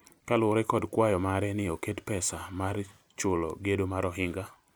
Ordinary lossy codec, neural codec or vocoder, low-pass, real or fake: none; none; none; real